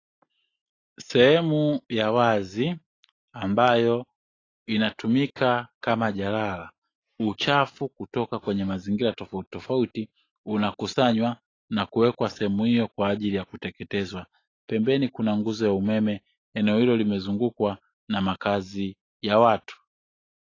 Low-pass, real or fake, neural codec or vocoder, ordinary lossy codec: 7.2 kHz; real; none; AAC, 32 kbps